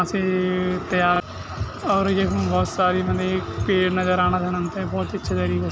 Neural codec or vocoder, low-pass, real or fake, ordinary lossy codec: none; none; real; none